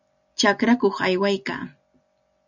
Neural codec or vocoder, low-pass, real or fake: none; 7.2 kHz; real